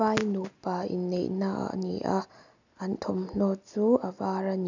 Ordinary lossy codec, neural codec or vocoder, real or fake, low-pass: none; none; real; 7.2 kHz